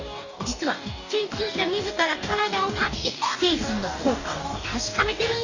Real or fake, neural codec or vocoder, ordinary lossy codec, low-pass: fake; codec, 44.1 kHz, 2.6 kbps, DAC; none; 7.2 kHz